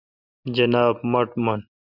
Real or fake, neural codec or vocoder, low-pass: real; none; 5.4 kHz